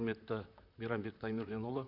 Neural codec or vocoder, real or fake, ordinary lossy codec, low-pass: vocoder, 44.1 kHz, 128 mel bands, Pupu-Vocoder; fake; none; 5.4 kHz